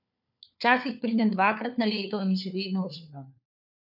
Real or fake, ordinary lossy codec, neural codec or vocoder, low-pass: fake; none; codec, 16 kHz, 4 kbps, FunCodec, trained on LibriTTS, 50 frames a second; 5.4 kHz